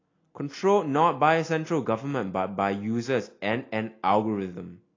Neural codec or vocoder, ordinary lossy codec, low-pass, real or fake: none; AAC, 32 kbps; 7.2 kHz; real